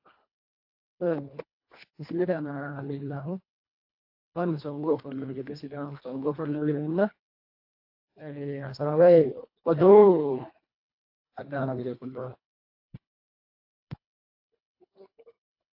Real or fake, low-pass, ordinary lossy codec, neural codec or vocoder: fake; 5.4 kHz; AAC, 32 kbps; codec, 24 kHz, 1.5 kbps, HILCodec